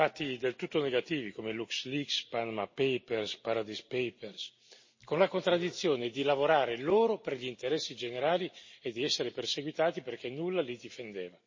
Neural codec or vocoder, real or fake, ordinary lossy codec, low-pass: none; real; MP3, 32 kbps; 7.2 kHz